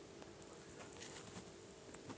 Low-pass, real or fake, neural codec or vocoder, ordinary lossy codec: none; real; none; none